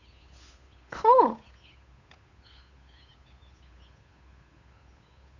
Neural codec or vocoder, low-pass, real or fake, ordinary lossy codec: codec, 16 kHz, 8 kbps, FunCodec, trained on Chinese and English, 25 frames a second; 7.2 kHz; fake; none